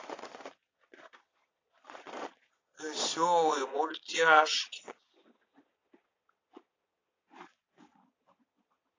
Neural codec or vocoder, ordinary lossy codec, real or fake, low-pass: vocoder, 22.05 kHz, 80 mel bands, Vocos; AAC, 32 kbps; fake; 7.2 kHz